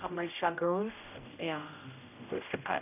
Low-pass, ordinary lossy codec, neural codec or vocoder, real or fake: 3.6 kHz; none; codec, 16 kHz, 0.5 kbps, X-Codec, HuBERT features, trained on general audio; fake